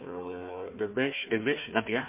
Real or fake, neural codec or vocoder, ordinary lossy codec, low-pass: fake; codec, 16 kHz, 1 kbps, FunCodec, trained on Chinese and English, 50 frames a second; MP3, 24 kbps; 3.6 kHz